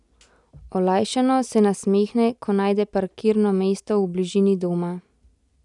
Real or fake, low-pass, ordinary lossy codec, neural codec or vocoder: real; 10.8 kHz; none; none